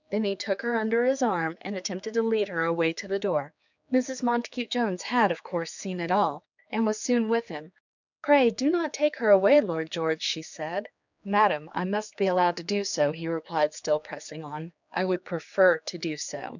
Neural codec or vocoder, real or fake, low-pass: codec, 16 kHz, 4 kbps, X-Codec, HuBERT features, trained on general audio; fake; 7.2 kHz